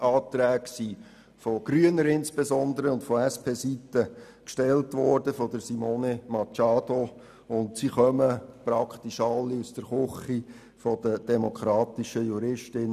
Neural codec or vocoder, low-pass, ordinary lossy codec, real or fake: none; 14.4 kHz; none; real